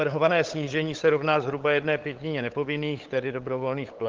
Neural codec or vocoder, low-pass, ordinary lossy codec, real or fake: codec, 16 kHz, 8 kbps, FunCodec, trained on LibriTTS, 25 frames a second; 7.2 kHz; Opus, 16 kbps; fake